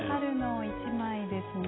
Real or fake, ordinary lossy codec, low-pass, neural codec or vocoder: real; AAC, 16 kbps; 7.2 kHz; none